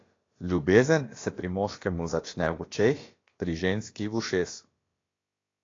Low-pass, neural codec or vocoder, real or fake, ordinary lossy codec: 7.2 kHz; codec, 16 kHz, about 1 kbps, DyCAST, with the encoder's durations; fake; AAC, 32 kbps